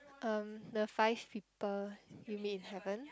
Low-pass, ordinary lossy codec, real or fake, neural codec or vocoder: none; none; real; none